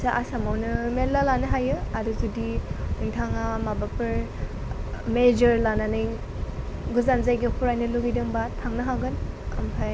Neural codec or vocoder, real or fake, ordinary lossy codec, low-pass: none; real; none; none